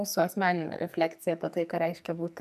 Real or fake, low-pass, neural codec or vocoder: fake; 14.4 kHz; codec, 44.1 kHz, 2.6 kbps, SNAC